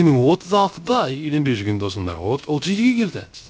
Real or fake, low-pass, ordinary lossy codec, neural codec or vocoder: fake; none; none; codec, 16 kHz, 0.3 kbps, FocalCodec